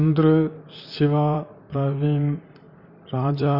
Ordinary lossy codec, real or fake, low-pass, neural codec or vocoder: none; fake; 5.4 kHz; vocoder, 44.1 kHz, 128 mel bands, Pupu-Vocoder